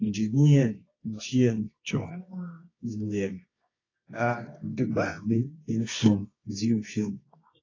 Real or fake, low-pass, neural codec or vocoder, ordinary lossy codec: fake; 7.2 kHz; codec, 24 kHz, 0.9 kbps, WavTokenizer, medium music audio release; AAC, 32 kbps